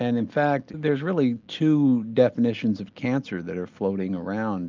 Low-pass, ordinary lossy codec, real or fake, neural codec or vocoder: 7.2 kHz; Opus, 24 kbps; real; none